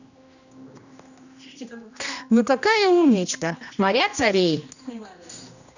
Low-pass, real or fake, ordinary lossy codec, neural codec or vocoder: 7.2 kHz; fake; none; codec, 16 kHz, 1 kbps, X-Codec, HuBERT features, trained on general audio